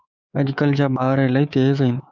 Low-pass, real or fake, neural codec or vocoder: 7.2 kHz; fake; codec, 24 kHz, 3.1 kbps, DualCodec